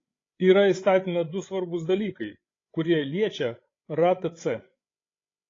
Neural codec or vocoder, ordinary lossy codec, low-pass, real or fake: codec, 16 kHz, 16 kbps, FreqCodec, larger model; AAC, 32 kbps; 7.2 kHz; fake